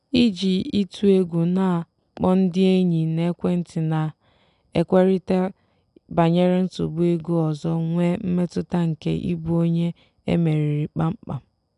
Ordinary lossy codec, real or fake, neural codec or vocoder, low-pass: none; real; none; 10.8 kHz